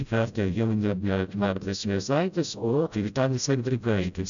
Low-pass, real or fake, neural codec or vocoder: 7.2 kHz; fake; codec, 16 kHz, 0.5 kbps, FreqCodec, smaller model